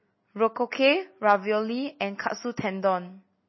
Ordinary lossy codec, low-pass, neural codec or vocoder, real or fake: MP3, 24 kbps; 7.2 kHz; none; real